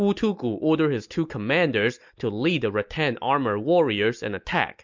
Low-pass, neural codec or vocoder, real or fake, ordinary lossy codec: 7.2 kHz; none; real; MP3, 64 kbps